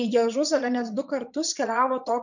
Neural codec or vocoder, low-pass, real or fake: vocoder, 22.05 kHz, 80 mel bands, Vocos; 7.2 kHz; fake